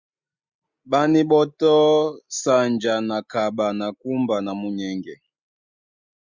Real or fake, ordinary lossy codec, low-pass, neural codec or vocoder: real; Opus, 64 kbps; 7.2 kHz; none